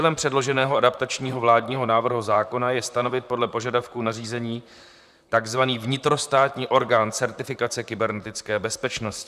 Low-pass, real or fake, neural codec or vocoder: 14.4 kHz; fake; vocoder, 44.1 kHz, 128 mel bands, Pupu-Vocoder